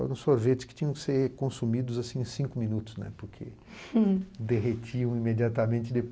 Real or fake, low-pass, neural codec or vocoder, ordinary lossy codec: real; none; none; none